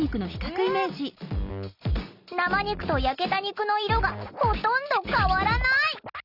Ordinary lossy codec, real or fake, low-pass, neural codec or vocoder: Opus, 64 kbps; real; 5.4 kHz; none